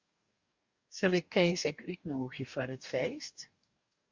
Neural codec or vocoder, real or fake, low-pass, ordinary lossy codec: codec, 44.1 kHz, 2.6 kbps, DAC; fake; 7.2 kHz; Opus, 64 kbps